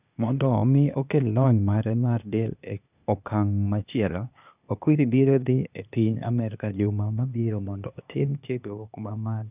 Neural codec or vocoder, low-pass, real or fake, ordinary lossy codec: codec, 16 kHz, 0.8 kbps, ZipCodec; 3.6 kHz; fake; none